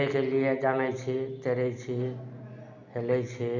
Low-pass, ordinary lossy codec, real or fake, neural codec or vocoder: 7.2 kHz; none; real; none